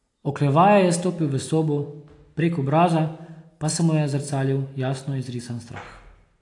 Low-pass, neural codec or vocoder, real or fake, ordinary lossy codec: 10.8 kHz; none; real; AAC, 48 kbps